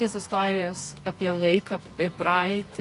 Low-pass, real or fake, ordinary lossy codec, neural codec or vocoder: 10.8 kHz; fake; AAC, 48 kbps; codec, 24 kHz, 0.9 kbps, WavTokenizer, medium music audio release